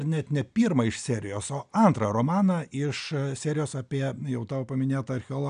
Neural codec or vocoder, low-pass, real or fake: none; 9.9 kHz; real